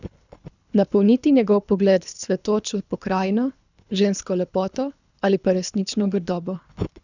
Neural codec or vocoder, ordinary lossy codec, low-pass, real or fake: codec, 24 kHz, 3 kbps, HILCodec; none; 7.2 kHz; fake